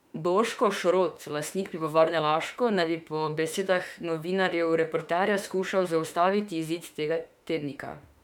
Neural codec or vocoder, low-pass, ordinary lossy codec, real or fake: autoencoder, 48 kHz, 32 numbers a frame, DAC-VAE, trained on Japanese speech; 19.8 kHz; none; fake